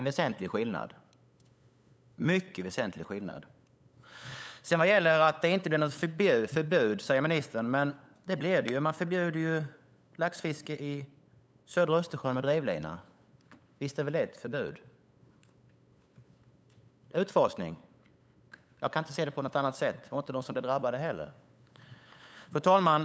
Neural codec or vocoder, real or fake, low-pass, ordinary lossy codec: codec, 16 kHz, 8 kbps, FunCodec, trained on LibriTTS, 25 frames a second; fake; none; none